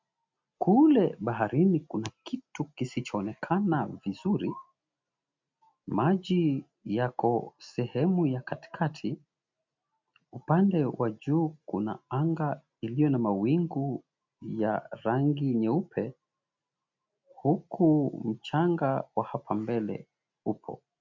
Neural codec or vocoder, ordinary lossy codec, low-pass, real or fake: none; MP3, 64 kbps; 7.2 kHz; real